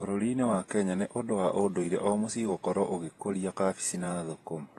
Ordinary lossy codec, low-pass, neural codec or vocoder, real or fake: AAC, 32 kbps; 19.8 kHz; vocoder, 48 kHz, 128 mel bands, Vocos; fake